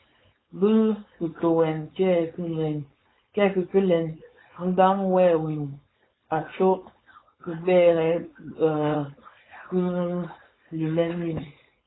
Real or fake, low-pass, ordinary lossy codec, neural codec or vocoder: fake; 7.2 kHz; AAC, 16 kbps; codec, 16 kHz, 4.8 kbps, FACodec